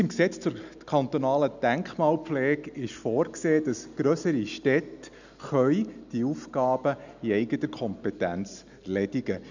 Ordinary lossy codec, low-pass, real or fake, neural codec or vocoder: none; 7.2 kHz; real; none